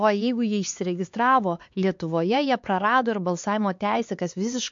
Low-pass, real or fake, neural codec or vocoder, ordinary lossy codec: 7.2 kHz; fake; codec, 16 kHz, 4 kbps, X-Codec, HuBERT features, trained on LibriSpeech; MP3, 48 kbps